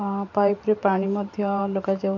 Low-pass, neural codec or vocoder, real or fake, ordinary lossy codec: 7.2 kHz; vocoder, 44.1 kHz, 128 mel bands every 512 samples, BigVGAN v2; fake; none